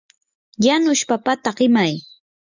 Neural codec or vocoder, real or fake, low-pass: none; real; 7.2 kHz